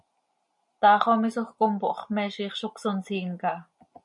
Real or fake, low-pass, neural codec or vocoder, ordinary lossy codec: real; 10.8 kHz; none; MP3, 48 kbps